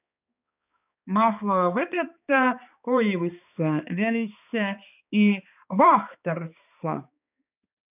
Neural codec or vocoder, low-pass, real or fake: codec, 16 kHz, 2 kbps, X-Codec, HuBERT features, trained on balanced general audio; 3.6 kHz; fake